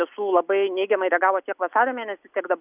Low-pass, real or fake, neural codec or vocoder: 3.6 kHz; real; none